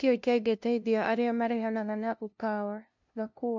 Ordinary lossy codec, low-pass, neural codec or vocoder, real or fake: MP3, 64 kbps; 7.2 kHz; codec, 16 kHz, 0.5 kbps, FunCodec, trained on LibriTTS, 25 frames a second; fake